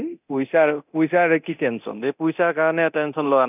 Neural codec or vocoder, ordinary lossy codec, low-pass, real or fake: codec, 24 kHz, 0.9 kbps, DualCodec; none; 3.6 kHz; fake